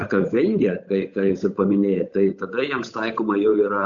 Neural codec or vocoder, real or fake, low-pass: codec, 16 kHz, 8 kbps, FunCodec, trained on Chinese and English, 25 frames a second; fake; 7.2 kHz